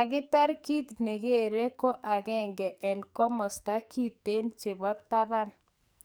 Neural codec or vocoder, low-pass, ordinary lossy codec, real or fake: codec, 44.1 kHz, 2.6 kbps, SNAC; none; none; fake